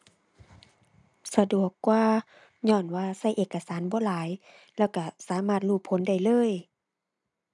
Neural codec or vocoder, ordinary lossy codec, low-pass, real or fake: none; none; 10.8 kHz; real